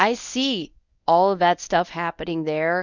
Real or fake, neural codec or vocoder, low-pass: fake; codec, 24 kHz, 0.9 kbps, WavTokenizer, medium speech release version 1; 7.2 kHz